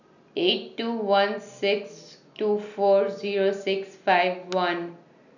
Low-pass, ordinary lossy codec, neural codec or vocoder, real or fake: 7.2 kHz; none; none; real